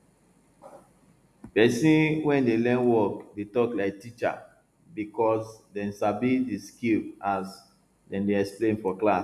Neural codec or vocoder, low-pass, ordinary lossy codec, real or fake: none; 14.4 kHz; none; real